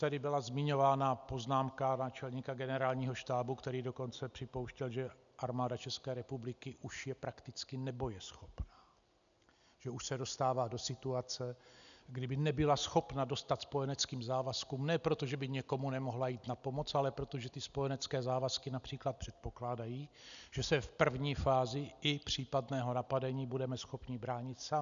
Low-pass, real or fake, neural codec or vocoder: 7.2 kHz; real; none